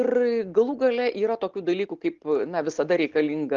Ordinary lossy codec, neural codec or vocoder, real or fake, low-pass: Opus, 32 kbps; none; real; 7.2 kHz